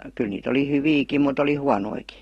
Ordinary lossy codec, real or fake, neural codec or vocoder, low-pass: AAC, 32 kbps; real; none; 19.8 kHz